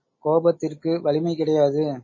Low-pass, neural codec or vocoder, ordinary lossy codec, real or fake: 7.2 kHz; none; MP3, 32 kbps; real